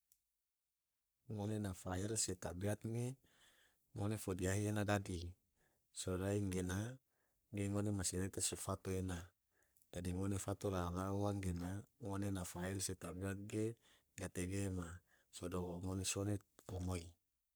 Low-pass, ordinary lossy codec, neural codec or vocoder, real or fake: none; none; codec, 44.1 kHz, 3.4 kbps, Pupu-Codec; fake